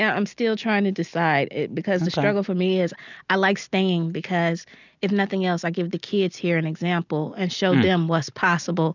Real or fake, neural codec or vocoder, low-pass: real; none; 7.2 kHz